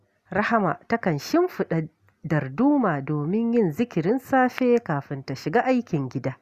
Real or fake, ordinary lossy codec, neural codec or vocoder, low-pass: real; Opus, 64 kbps; none; 14.4 kHz